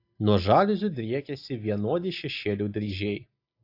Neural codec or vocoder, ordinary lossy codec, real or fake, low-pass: none; AAC, 32 kbps; real; 5.4 kHz